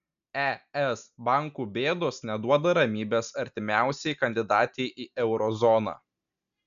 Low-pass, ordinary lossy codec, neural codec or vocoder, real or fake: 7.2 kHz; MP3, 96 kbps; none; real